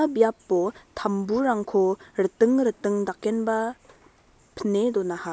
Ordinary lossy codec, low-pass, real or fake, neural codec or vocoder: none; none; real; none